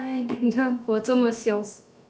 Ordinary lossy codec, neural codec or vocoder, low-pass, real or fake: none; codec, 16 kHz, about 1 kbps, DyCAST, with the encoder's durations; none; fake